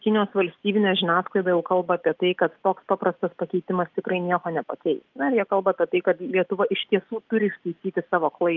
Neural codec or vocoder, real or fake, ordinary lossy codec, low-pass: none; real; Opus, 32 kbps; 7.2 kHz